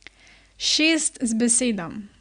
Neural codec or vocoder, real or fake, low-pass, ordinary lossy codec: none; real; 9.9 kHz; Opus, 64 kbps